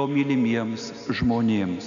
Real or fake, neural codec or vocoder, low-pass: real; none; 7.2 kHz